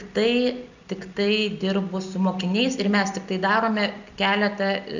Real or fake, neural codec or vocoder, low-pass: real; none; 7.2 kHz